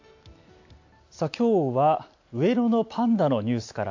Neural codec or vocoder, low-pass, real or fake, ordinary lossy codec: none; 7.2 kHz; real; AAC, 48 kbps